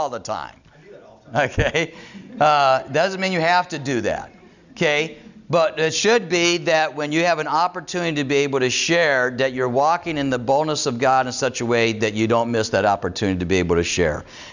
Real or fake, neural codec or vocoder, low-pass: real; none; 7.2 kHz